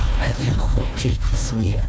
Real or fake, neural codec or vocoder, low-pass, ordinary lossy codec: fake; codec, 16 kHz, 1 kbps, FunCodec, trained on Chinese and English, 50 frames a second; none; none